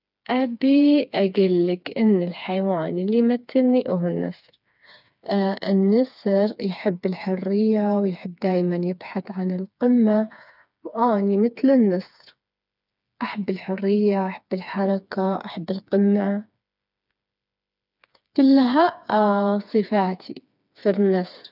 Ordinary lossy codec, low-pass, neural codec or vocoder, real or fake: none; 5.4 kHz; codec, 16 kHz, 4 kbps, FreqCodec, smaller model; fake